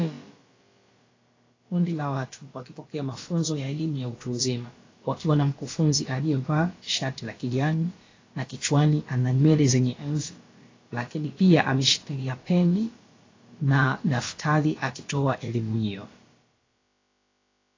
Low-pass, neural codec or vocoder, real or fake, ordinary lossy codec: 7.2 kHz; codec, 16 kHz, about 1 kbps, DyCAST, with the encoder's durations; fake; AAC, 32 kbps